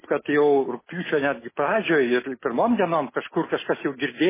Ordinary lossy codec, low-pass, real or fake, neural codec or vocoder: MP3, 16 kbps; 3.6 kHz; real; none